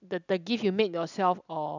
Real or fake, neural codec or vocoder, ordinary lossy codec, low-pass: real; none; none; 7.2 kHz